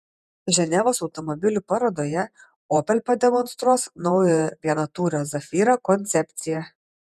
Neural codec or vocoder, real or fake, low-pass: vocoder, 48 kHz, 128 mel bands, Vocos; fake; 14.4 kHz